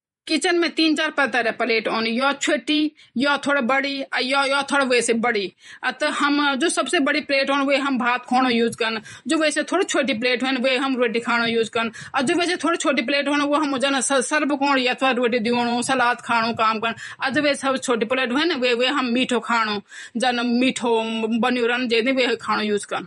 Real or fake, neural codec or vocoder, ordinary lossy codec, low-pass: fake; vocoder, 48 kHz, 128 mel bands, Vocos; MP3, 48 kbps; 19.8 kHz